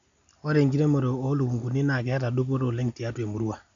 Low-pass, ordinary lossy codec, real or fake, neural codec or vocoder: 7.2 kHz; none; real; none